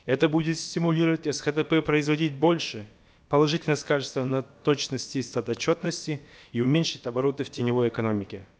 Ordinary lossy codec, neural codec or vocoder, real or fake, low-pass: none; codec, 16 kHz, about 1 kbps, DyCAST, with the encoder's durations; fake; none